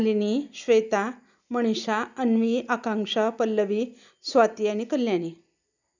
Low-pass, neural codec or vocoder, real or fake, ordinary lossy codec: 7.2 kHz; none; real; none